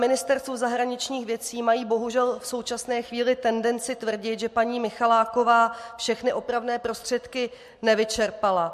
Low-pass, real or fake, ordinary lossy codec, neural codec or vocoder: 14.4 kHz; real; MP3, 64 kbps; none